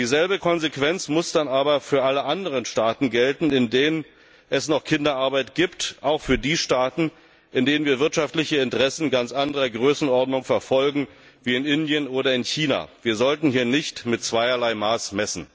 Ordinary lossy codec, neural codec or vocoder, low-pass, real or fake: none; none; none; real